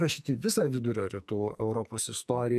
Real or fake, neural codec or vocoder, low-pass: fake; codec, 44.1 kHz, 2.6 kbps, SNAC; 14.4 kHz